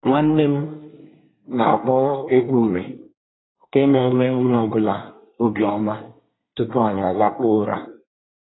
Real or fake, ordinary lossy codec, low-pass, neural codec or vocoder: fake; AAC, 16 kbps; 7.2 kHz; codec, 24 kHz, 1 kbps, SNAC